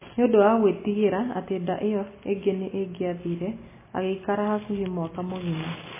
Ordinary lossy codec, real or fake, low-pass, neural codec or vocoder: MP3, 16 kbps; real; 3.6 kHz; none